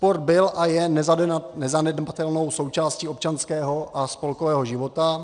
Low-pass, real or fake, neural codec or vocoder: 9.9 kHz; real; none